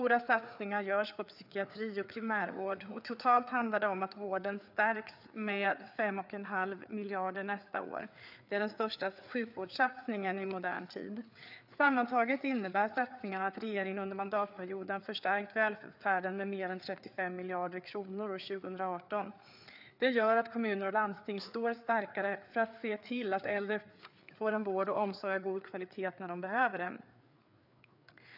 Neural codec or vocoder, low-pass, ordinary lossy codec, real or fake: codec, 16 kHz, 4 kbps, FreqCodec, larger model; 5.4 kHz; none; fake